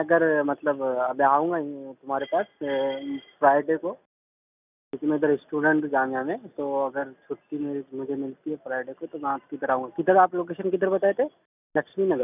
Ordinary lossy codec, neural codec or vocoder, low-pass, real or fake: none; none; 3.6 kHz; real